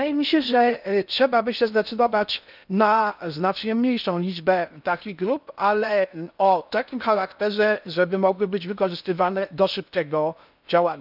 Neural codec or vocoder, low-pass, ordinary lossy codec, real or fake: codec, 16 kHz in and 24 kHz out, 0.6 kbps, FocalCodec, streaming, 4096 codes; 5.4 kHz; none; fake